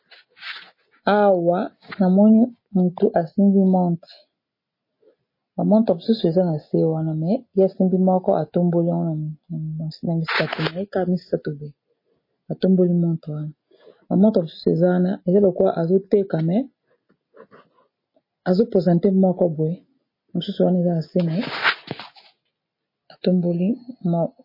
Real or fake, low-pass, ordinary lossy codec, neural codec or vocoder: real; 5.4 kHz; MP3, 24 kbps; none